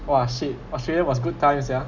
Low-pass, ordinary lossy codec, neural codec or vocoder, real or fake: 7.2 kHz; none; none; real